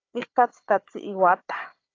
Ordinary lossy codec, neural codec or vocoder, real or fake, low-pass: AAC, 32 kbps; codec, 16 kHz, 4 kbps, FunCodec, trained on Chinese and English, 50 frames a second; fake; 7.2 kHz